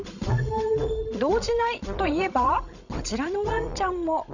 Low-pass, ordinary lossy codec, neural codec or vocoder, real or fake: 7.2 kHz; none; codec, 16 kHz, 16 kbps, FreqCodec, larger model; fake